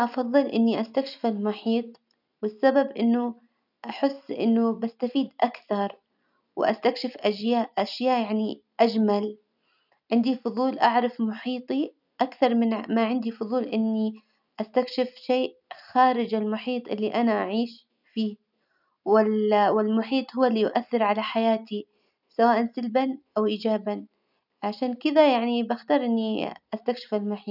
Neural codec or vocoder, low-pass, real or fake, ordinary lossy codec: none; 5.4 kHz; real; none